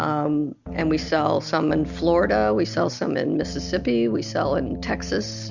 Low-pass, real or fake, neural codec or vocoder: 7.2 kHz; real; none